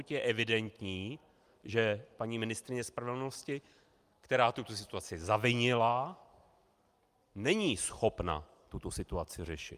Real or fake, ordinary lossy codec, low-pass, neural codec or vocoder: real; Opus, 32 kbps; 14.4 kHz; none